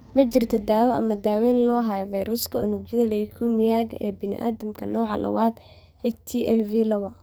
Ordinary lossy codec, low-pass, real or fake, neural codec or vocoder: none; none; fake; codec, 44.1 kHz, 2.6 kbps, SNAC